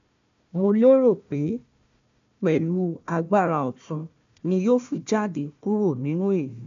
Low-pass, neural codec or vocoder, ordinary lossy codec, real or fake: 7.2 kHz; codec, 16 kHz, 1 kbps, FunCodec, trained on Chinese and English, 50 frames a second; MP3, 64 kbps; fake